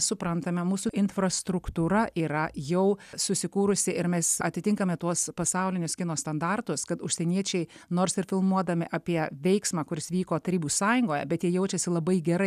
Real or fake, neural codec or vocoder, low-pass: real; none; 14.4 kHz